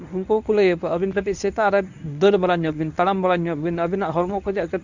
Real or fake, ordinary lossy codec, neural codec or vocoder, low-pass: fake; none; codec, 24 kHz, 0.9 kbps, WavTokenizer, medium speech release version 1; 7.2 kHz